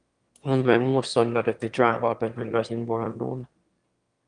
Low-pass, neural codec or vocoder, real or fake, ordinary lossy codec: 9.9 kHz; autoencoder, 22.05 kHz, a latent of 192 numbers a frame, VITS, trained on one speaker; fake; Opus, 32 kbps